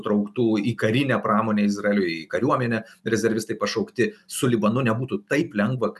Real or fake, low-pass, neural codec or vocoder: real; 14.4 kHz; none